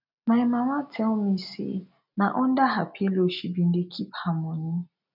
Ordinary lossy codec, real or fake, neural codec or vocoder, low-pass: none; real; none; 5.4 kHz